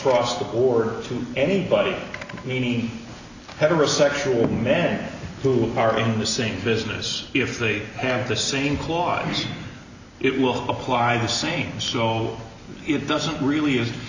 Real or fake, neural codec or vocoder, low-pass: real; none; 7.2 kHz